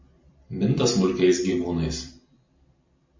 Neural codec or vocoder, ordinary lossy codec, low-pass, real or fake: vocoder, 44.1 kHz, 128 mel bands every 256 samples, BigVGAN v2; MP3, 48 kbps; 7.2 kHz; fake